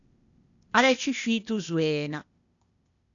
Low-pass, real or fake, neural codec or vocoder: 7.2 kHz; fake; codec, 16 kHz, 0.8 kbps, ZipCodec